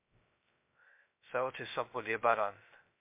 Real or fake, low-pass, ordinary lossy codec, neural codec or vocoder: fake; 3.6 kHz; MP3, 32 kbps; codec, 16 kHz, 0.2 kbps, FocalCodec